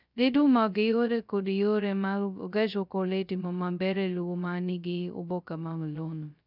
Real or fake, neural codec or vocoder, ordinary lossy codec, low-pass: fake; codec, 16 kHz, 0.2 kbps, FocalCodec; none; 5.4 kHz